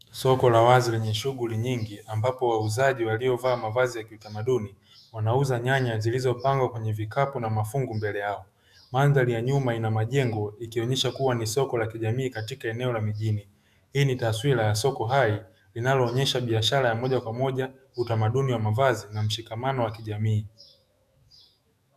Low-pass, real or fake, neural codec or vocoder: 14.4 kHz; fake; autoencoder, 48 kHz, 128 numbers a frame, DAC-VAE, trained on Japanese speech